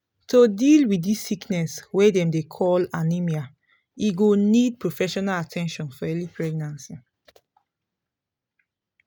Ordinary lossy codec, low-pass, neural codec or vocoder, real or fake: none; none; none; real